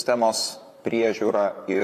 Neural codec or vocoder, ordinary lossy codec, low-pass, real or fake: vocoder, 44.1 kHz, 128 mel bands, Pupu-Vocoder; MP3, 96 kbps; 14.4 kHz; fake